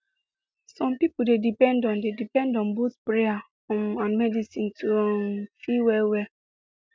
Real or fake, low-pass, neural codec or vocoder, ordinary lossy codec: real; none; none; none